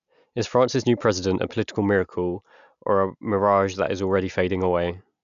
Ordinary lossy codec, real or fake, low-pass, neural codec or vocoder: none; real; 7.2 kHz; none